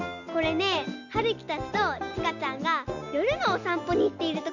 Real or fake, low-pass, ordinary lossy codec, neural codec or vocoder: real; 7.2 kHz; none; none